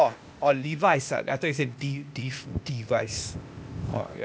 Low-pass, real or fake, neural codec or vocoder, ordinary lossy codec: none; fake; codec, 16 kHz, 0.8 kbps, ZipCodec; none